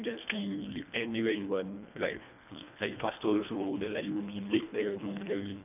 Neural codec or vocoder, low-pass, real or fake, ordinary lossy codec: codec, 24 kHz, 1.5 kbps, HILCodec; 3.6 kHz; fake; none